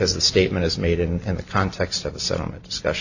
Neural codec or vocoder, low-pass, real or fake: none; 7.2 kHz; real